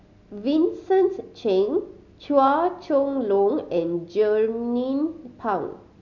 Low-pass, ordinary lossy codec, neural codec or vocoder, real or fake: 7.2 kHz; none; none; real